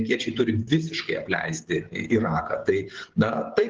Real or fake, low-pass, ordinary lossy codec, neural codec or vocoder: fake; 7.2 kHz; Opus, 16 kbps; codec, 16 kHz, 16 kbps, FreqCodec, smaller model